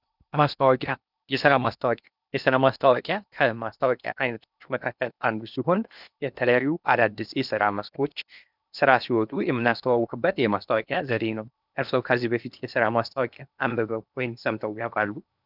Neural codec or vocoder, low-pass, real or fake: codec, 16 kHz in and 24 kHz out, 0.8 kbps, FocalCodec, streaming, 65536 codes; 5.4 kHz; fake